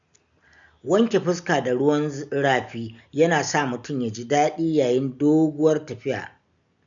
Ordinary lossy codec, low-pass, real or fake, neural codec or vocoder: none; 7.2 kHz; real; none